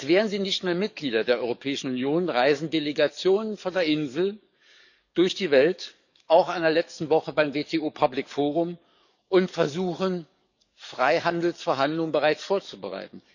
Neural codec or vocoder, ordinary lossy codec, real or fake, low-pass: codec, 44.1 kHz, 7.8 kbps, DAC; none; fake; 7.2 kHz